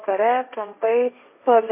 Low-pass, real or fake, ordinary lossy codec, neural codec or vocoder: 3.6 kHz; fake; MP3, 32 kbps; codec, 16 kHz, 1.1 kbps, Voila-Tokenizer